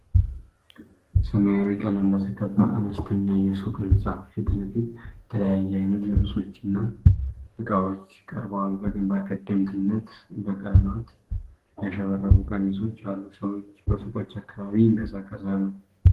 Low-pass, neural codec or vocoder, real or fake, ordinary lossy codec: 14.4 kHz; codec, 32 kHz, 1.9 kbps, SNAC; fake; Opus, 16 kbps